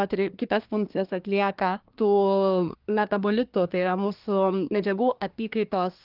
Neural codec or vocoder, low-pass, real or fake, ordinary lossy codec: codec, 24 kHz, 1 kbps, SNAC; 5.4 kHz; fake; Opus, 32 kbps